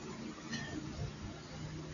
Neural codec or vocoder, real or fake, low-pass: none; real; 7.2 kHz